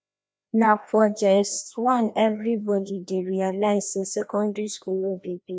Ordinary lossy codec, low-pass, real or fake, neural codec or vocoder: none; none; fake; codec, 16 kHz, 1 kbps, FreqCodec, larger model